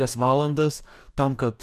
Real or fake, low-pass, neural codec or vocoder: fake; 14.4 kHz; codec, 44.1 kHz, 2.6 kbps, DAC